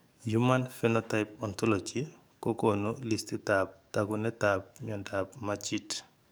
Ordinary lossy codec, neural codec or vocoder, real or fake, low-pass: none; codec, 44.1 kHz, 7.8 kbps, DAC; fake; none